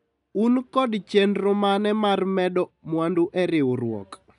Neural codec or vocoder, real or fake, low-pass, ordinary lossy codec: none; real; 10.8 kHz; none